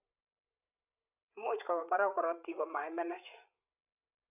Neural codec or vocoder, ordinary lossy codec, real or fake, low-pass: codec, 16 kHz, 8 kbps, FreqCodec, larger model; none; fake; 3.6 kHz